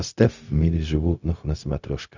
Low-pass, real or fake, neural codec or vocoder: 7.2 kHz; fake; codec, 16 kHz, 0.4 kbps, LongCat-Audio-Codec